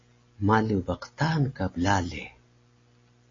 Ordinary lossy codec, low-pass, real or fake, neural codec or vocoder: AAC, 32 kbps; 7.2 kHz; real; none